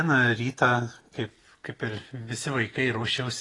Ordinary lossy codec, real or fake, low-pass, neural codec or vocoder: AAC, 32 kbps; real; 10.8 kHz; none